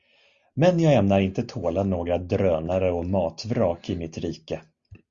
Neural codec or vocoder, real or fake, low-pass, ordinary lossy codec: none; real; 7.2 kHz; Opus, 64 kbps